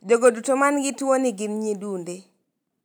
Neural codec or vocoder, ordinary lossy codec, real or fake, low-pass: none; none; real; none